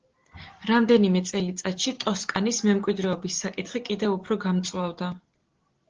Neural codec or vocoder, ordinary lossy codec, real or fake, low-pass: none; Opus, 16 kbps; real; 7.2 kHz